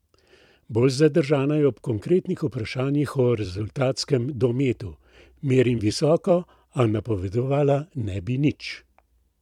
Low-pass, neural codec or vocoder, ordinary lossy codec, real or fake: 19.8 kHz; vocoder, 44.1 kHz, 128 mel bands every 256 samples, BigVGAN v2; MP3, 96 kbps; fake